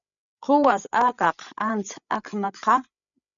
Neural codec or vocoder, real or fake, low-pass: codec, 16 kHz, 8 kbps, FreqCodec, larger model; fake; 7.2 kHz